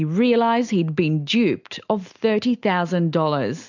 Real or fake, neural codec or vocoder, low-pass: real; none; 7.2 kHz